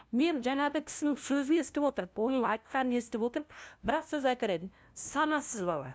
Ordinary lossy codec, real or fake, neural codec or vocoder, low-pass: none; fake; codec, 16 kHz, 0.5 kbps, FunCodec, trained on LibriTTS, 25 frames a second; none